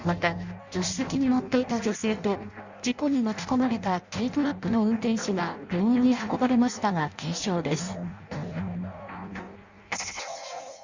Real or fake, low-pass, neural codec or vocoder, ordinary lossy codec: fake; 7.2 kHz; codec, 16 kHz in and 24 kHz out, 0.6 kbps, FireRedTTS-2 codec; Opus, 64 kbps